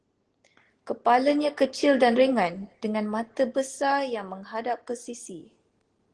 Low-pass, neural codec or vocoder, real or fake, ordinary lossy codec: 9.9 kHz; none; real; Opus, 16 kbps